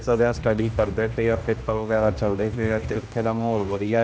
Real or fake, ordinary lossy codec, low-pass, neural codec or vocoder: fake; none; none; codec, 16 kHz, 1 kbps, X-Codec, HuBERT features, trained on general audio